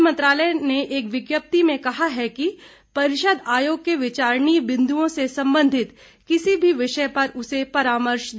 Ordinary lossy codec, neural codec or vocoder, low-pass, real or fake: none; none; none; real